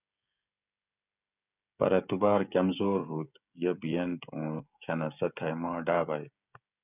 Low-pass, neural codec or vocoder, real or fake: 3.6 kHz; codec, 16 kHz, 16 kbps, FreqCodec, smaller model; fake